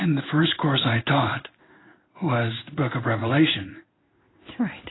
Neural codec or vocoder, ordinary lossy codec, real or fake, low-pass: codec, 16 kHz in and 24 kHz out, 1 kbps, XY-Tokenizer; AAC, 16 kbps; fake; 7.2 kHz